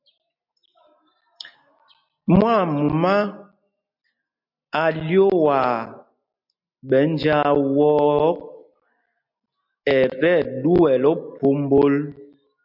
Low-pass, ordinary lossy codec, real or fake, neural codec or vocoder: 5.4 kHz; MP3, 48 kbps; real; none